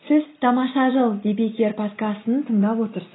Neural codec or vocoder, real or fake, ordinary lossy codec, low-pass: none; real; AAC, 16 kbps; 7.2 kHz